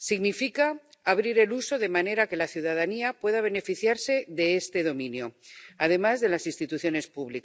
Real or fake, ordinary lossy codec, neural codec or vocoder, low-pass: real; none; none; none